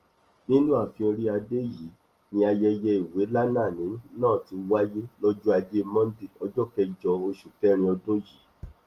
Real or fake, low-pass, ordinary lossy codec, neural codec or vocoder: real; 14.4 kHz; Opus, 24 kbps; none